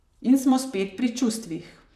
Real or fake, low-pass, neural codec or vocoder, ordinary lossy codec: fake; 14.4 kHz; vocoder, 44.1 kHz, 128 mel bands, Pupu-Vocoder; none